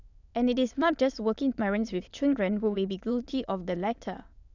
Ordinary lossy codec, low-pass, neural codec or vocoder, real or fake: none; 7.2 kHz; autoencoder, 22.05 kHz, a latent of 192 numbers a frame, VITS, trained on many speakers; fake